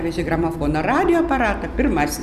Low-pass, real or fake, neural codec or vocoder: 14.4 kHz; real; none